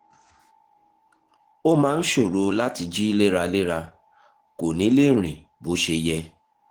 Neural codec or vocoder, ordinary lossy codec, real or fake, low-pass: codec, 44.1 kHz, 7.8 kbps, DAC; Opus, 16 kbps; fake; 19.8 kHz